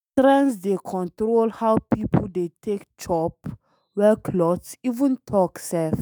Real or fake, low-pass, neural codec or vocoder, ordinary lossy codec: fake; none; autoencoder, 48 kHz, 128 numbers a frame, DAC-VAE, trained on Japanese speech; none